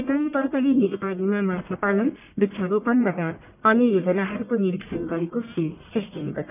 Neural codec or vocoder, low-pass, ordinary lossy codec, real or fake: codec, 44.1 kHz, 1.7 kbps, Pupu-Codec; 3.6 kHz; none; fake